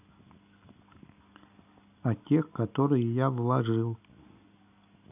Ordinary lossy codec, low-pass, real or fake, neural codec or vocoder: none; 3.6 kHz; real; none